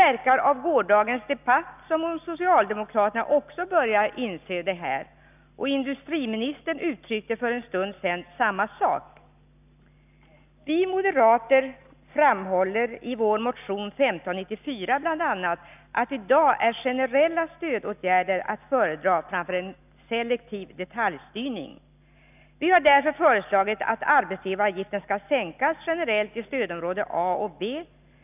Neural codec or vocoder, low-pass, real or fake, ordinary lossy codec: none; 3.6 kHz; real; none